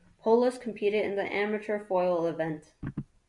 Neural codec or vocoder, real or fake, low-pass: none; real; 10.8 kHz